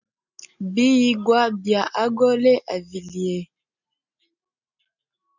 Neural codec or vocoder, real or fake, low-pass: none; real; 7.2 kHz